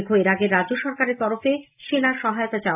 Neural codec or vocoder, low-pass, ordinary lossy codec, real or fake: none; 3.6 kHz; AAC, 24 kbps; real